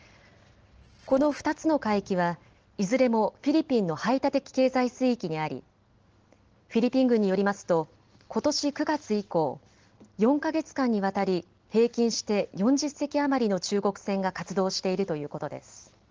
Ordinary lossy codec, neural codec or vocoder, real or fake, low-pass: Opus, 16 kbps; none; real; 7.2 kHz